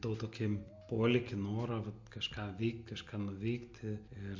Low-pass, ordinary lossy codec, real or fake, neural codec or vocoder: 7.2 kHz; MP3, 48 kbps; real; none